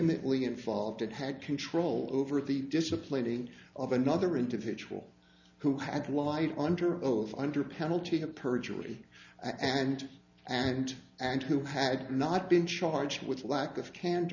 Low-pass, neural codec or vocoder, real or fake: 7.2 kHz; none; real